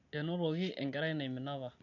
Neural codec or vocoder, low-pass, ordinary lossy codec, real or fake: none; 7.2 kHz; none; real